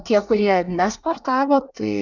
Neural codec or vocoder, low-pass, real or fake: codec, 24 kHz, 1 kbps, SNAC; 7.2 kHz; fake